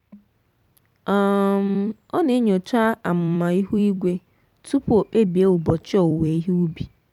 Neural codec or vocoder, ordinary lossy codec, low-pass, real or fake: vocoder, 44.1 kHz, 128 mel bands every 256 samples, BigVGAN v2; none; 19.8 kHz; fake